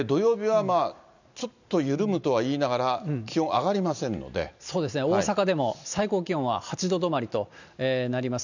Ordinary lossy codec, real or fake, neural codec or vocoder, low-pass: none; real; none; 7.2 kHz